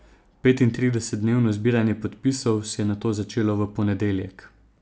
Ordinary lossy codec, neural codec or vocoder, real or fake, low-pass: none; none; real; none